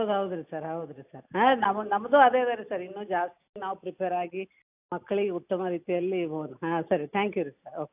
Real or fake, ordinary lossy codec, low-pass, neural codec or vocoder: real; AAC, 32 kbps; 3.6 kHz; none